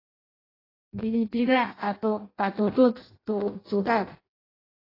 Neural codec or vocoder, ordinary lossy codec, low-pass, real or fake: codec, 16 kHz in and 24 kHz out, 0.6 kbps, FireRedTTS-2 codec; AAC, 24 kbps; 5.4 kHz; fake